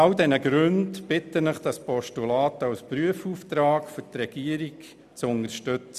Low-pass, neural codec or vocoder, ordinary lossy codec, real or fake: 14.4 kHz; none; none; real